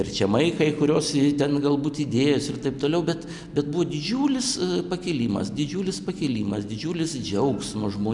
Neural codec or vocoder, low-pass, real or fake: none; 10.8 kHz; real